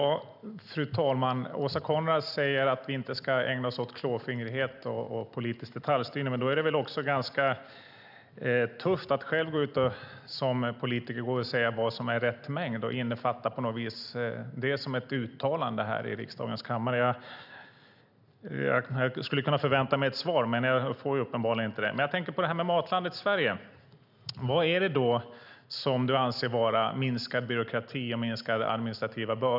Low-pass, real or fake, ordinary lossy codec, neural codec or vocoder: 5.4 kHz; real; none; none